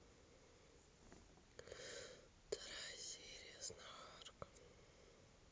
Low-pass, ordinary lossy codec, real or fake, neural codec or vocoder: none; none; real; none